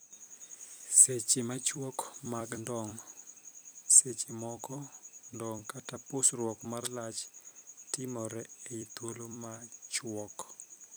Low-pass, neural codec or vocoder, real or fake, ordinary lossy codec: none; vocoder, 44.1 kHz, 128 mel bands every 256 samples, BigVGAN v2; fake; none